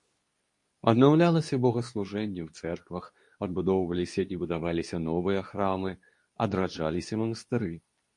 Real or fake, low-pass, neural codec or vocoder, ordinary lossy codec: fake; 10.8 kHz; codec, 24 kHz, 0.9 kbps, WavTokenizer, medium speech release version 2; MP3, 48 kbps